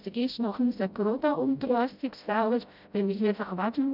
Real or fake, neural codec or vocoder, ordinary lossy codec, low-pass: fake; codec, 16 kHz, 0.5 kbps, FreqCodec, smaller model; none; 5.4 kHz